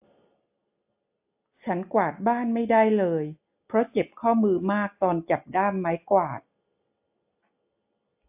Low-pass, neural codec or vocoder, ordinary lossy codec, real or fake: 3.6 kHz; none; AAC, 32 kbps; real